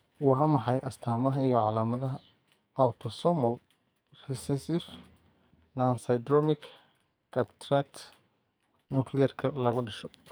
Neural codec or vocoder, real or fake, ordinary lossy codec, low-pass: codec, 44.1 kHz, 2.6 kbps, SNAC; fake; none; none